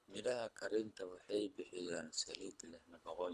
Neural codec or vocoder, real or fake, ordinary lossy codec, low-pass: codec, 24 kHz, 3 kbps, HILCodec; fake; none; none